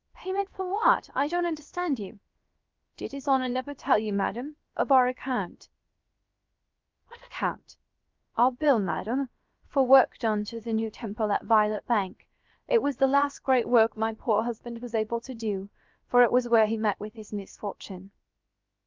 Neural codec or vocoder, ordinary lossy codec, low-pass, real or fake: codec, 16 kHz, about 1 kbps, DyCAST, with the encoder's durations; Opus, 32 kbps; 7.2 kHz; fake